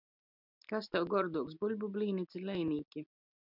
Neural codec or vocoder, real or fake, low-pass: none; real; 5.4 kHz